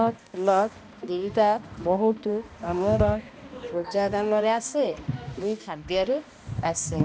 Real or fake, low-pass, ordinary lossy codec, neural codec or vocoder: fake; none; none; codec, 16 kHz, 1 kbps, X-Codec, HuBERT features, trained on balanced general audio